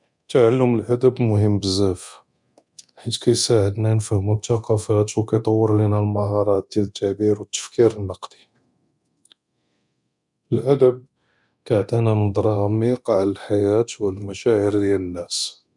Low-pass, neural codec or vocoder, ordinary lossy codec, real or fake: 10.8 kHz; codec, 24 kHz, 0.9 kbps, DualCodec; none; fake